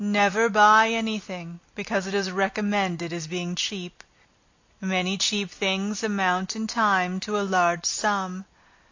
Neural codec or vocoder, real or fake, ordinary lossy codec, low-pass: none; real; AAC, 48 kbps; 7.2 kHz